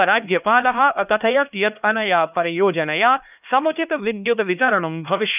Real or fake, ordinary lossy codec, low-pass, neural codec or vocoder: fake; none; 3.6 kHz; codec, 16 kHz, 1 kbps, X-Codec, HuBERT features, trained on LibriSpeech